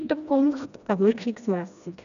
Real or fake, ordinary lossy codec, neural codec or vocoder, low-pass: fake; none; codec, 16 kHz, 1 kbps, FreqCodec, smaller model; 7.2 kHz